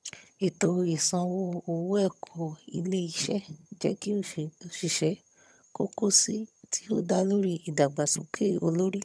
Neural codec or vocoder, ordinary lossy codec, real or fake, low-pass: vocoder, 22.05 kHz, 80 mel bands, HiFi-GAN; none; fake; none